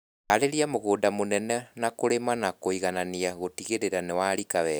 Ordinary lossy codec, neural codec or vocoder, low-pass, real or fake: none; none; none; real